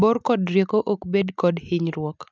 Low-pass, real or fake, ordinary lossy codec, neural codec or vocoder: none; real; none; none